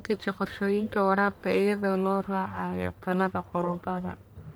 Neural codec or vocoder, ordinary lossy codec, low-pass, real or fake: codec, 44.1 kHz, 1.7 kbps, Pupu-Codec; none; none; fake